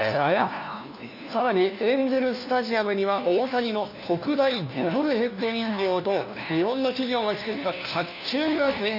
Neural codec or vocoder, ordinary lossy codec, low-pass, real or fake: codec, 16 kHz, 1 kbps, FunCodec, trained on LibriTTS, 50 frames a second; none; 5.4 kHz; fake